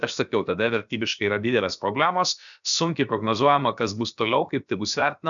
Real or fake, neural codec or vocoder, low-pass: fake; codec, 16 kHz, about 1 kbps, DyCAST, with the encoder's durations; 7.2 kHz